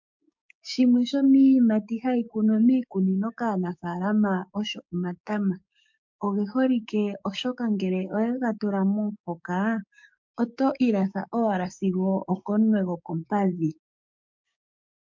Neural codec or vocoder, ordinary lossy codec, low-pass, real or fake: codec, 16 kHz, 6 kbps, DAC; MP3, 48 kbps; 7.2 kHz; fake